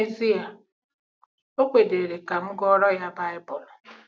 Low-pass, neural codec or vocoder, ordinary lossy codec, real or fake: none; none; none; real